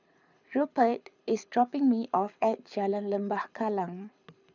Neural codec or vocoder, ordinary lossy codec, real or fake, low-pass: codec, 24 kHz, 6 kbps, HILCodec; none; fake; 7.2 kHz